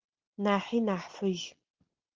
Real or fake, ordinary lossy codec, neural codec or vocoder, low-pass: real; Opus, 16 kbps; none; 7.2 kHz